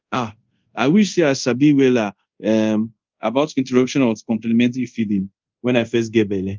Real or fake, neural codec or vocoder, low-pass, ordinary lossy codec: fake; codec, 24 kHz, 0.5 kbps, DualCodec; 7.2 kHz; Opus, 32 kbps